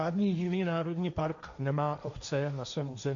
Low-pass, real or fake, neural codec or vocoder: 7.2 kHz; fake; codec, 16 kHz, 1.1 kbps, Voila-Tokenizer